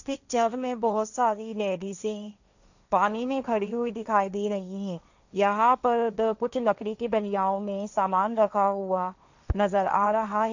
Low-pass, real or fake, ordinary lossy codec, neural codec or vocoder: 7.2 kHz; fake; none; codec, 16 kHz, 1.1 kbps, Voila-Tokenizer